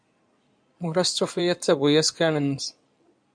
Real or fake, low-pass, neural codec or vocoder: fake; 9.9 kHz; codec, 16 kHz in and 24 kHz out, 2.2 kbps, FireRedTTS-2 codec